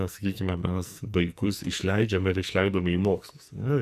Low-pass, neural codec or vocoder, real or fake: 14.4 kHz; codec, 44.1 kHz, 2.6 kbps, SNAC; fake